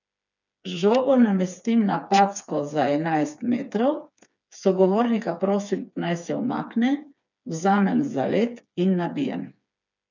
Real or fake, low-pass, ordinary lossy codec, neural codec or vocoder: fake; 7.2 kHz; none; codec, 16 kHz, 4 kbps, FreqCodec, smaller model